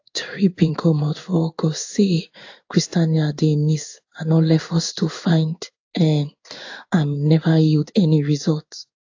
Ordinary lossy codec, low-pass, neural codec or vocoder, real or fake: AAC, 48 kbps; 7.2 kHz; codec, 16 kHz in and 24 kHz out, 1 kbps, XY-Tokenizer; fake